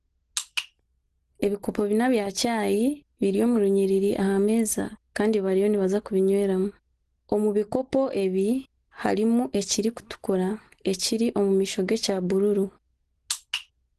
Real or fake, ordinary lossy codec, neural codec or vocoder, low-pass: real; Opus, 16 kbps; none; 10.8 kHz